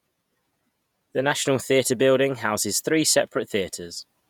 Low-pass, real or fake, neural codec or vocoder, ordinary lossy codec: 19.8 kHz; fake; vocoder, 48 kHz, 128 mel bands, Vocos; none